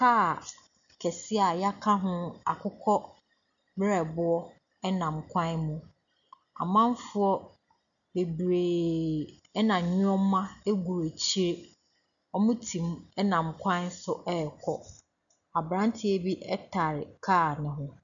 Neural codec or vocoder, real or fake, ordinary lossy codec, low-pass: none; real; MP3, 96 kbps; 7.2 kHz